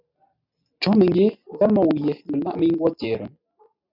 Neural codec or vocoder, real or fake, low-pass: none; real; 5.4 kHz